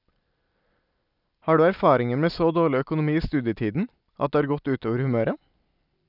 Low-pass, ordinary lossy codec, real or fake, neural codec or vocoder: 5.4 kHz; none; real; none